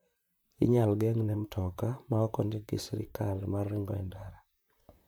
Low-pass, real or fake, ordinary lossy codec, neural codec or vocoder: none; fake; none; vocoder, 44.1 kHz, 128 mel bands, Pupu-Vocoder